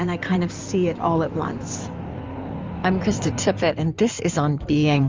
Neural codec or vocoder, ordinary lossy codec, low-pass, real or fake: codec, 16 kHz in and 24 kHz out, 2.2 kbps, FireRedTTS-2 codec; Opus, 32 kbps; 7.2 kHz; fake